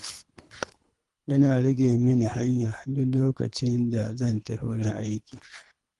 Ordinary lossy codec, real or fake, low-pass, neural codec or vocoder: Opus, 24 kbps; fake; 10.8 kHz; codec, 24 kHz, 3 kbps, HILCodec